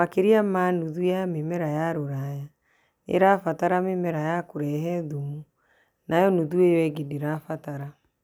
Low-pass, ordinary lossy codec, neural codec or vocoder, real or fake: 19.8 kHz; none; none; real